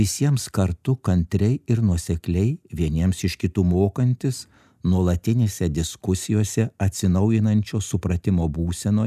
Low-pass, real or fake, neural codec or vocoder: 14.4 kHz; real; none